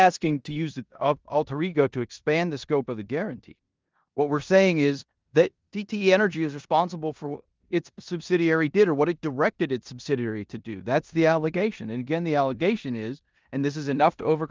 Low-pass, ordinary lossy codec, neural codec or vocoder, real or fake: 7.2 kHz; Opus, 24 kbps; codec, 16 kHz in and 24 kHz out, 0.9 kbps, LongCat-Audio-Codec, four codebook decoder; fake